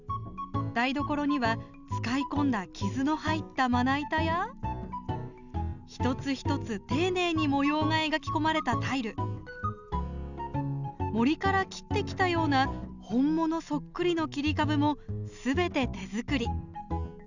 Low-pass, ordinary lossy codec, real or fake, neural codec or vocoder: 7.2 kHz; none; real; none